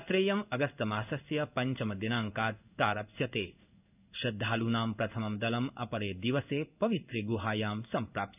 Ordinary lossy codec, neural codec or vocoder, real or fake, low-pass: none; codec, 16 kHz in and 24 kHz out, 1 kbps, XY-Tokenizer; fake; 3.6 kHz